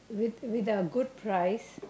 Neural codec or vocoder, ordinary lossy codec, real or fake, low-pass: none; none; real; none